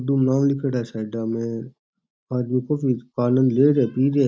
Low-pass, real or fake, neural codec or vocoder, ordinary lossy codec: none; real; none; none